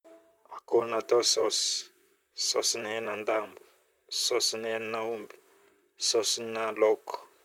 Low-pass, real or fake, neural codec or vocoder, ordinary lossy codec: 19.8 kHz; fake; vocoder, 44.1 kHz, 128 mel bands, Pupu-Vocoder; none